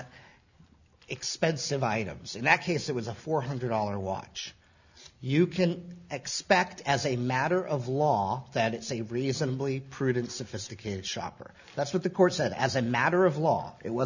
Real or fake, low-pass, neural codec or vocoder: real; 7.2 kHz; none